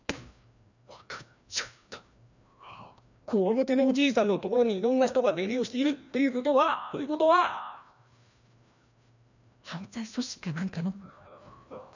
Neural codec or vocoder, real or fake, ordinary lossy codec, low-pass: codec, 16 kHz, 1 kbps, FreqCodec, larger model; fake; none; 7.2 kHz